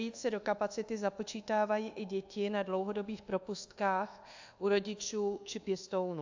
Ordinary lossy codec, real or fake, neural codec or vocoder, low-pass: AAC, 48 kbps; fake; codec, 24 kHz, 1.2 kbps, DualCodec; 7.2 kHz